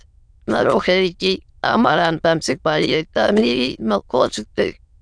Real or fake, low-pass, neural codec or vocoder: fake; 9.9 kHz; autoencoder, 22.05 kHz, a latent of 192 numbers a frame, VITS, trained on many speakers